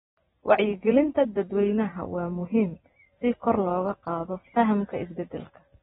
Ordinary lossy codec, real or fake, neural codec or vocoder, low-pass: AAC, 16 kbps; fake; vocoder, 44.1 kHz, 128 mel bands every 256 samples, BigVGAN v2; 19.8 kHz